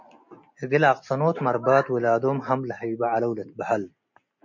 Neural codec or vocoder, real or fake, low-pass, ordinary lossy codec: none; real; 7.2 kHz; MP3, 48 kbps